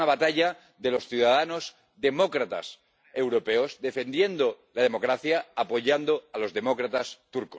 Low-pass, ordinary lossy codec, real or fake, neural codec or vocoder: none; none; real; none